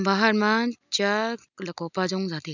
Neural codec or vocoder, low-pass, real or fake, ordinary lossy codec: none; 7.2 kHz; real; none